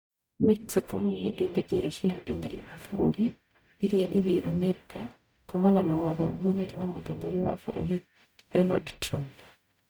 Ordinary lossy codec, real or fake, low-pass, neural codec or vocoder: none; fake; none; codec, 44.1 kHz, 0.9 kbps, DAC